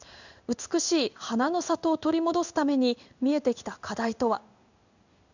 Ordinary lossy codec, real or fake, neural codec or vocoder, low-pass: none; fake; codec, 16 kHz in and 24 kHz out, 1 kbps, XY-Tokenizer; 7.2 kHz